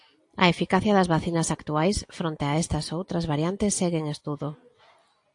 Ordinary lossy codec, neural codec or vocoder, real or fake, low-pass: AAC, 64 kbps; none; real; 10.8 kHz